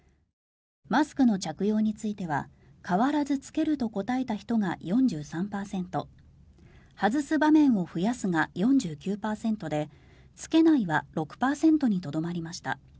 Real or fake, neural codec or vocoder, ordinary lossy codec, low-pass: real; none; none; none